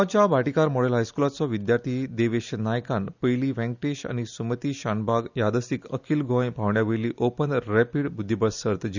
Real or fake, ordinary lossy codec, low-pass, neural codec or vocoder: real; none; 7.2 kHz; none